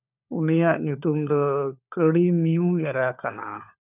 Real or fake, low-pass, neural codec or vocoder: fake; 3.6 kHz; codec, 16 kHz, 4 kbps, FunCodec, trained on LibriTTS, 50 frames a second